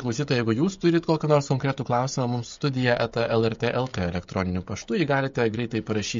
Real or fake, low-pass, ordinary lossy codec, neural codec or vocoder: fake; 7.2 kHz; MP3, 48 kbps; codec, 16 kHz, 16 kbps, FreqCodec, smaller model